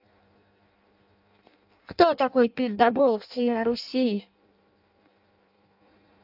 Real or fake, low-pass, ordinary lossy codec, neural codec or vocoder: fake; 5.4 kHz; AAC, 48 kbps; codec, 16 kHz in and 24 kHz out, 0.6 kbps, FireRedTTS-2 codec